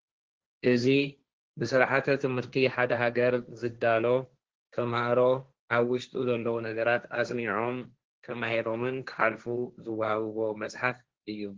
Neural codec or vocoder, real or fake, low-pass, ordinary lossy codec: codec, 16 kHz, 1.1 kbps, Voila-Tokenizer; fake; 7.2 kHz; Opus, 16 kbps